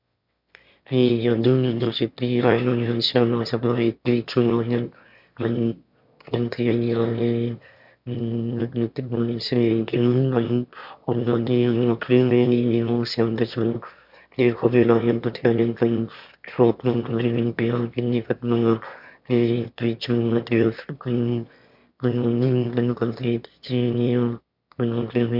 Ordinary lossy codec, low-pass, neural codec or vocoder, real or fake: MP3, 48 kbps; 5.4 kHz; autoencoder, 22.05 kHz, a latent of 192 numbers a frame, VITS, trained on one speaker; fake